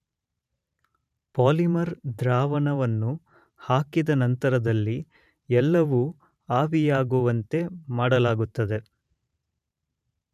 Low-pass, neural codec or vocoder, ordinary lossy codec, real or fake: 14.4 kHz; vocoder, 44.1 kHz, 128 mel bands every 512 samples, BigVGAN v2; none; fake